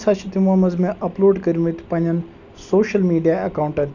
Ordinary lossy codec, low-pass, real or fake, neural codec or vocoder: none; 7.2 kHz; real; none